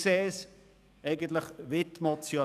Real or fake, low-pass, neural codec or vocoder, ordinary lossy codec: fake; 14.4 kHz; codec, 44.1 kHz, 7.8 kbps, DAC; none